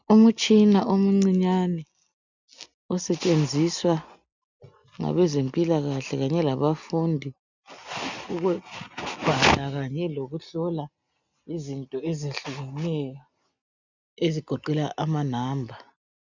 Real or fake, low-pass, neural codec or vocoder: real; 7.2 kHz; none